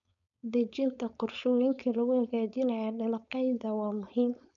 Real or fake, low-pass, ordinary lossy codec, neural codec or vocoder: fake; 7.2 kHz; none; codec, 16 kHz, 4.8 kbps, FACodec